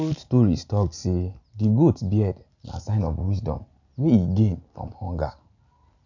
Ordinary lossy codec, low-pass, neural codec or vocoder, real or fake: none; 7.2 kHz; none; real